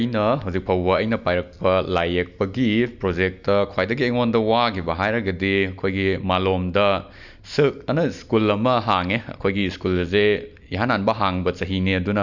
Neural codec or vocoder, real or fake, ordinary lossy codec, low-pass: none; real; none; 7.2 kHz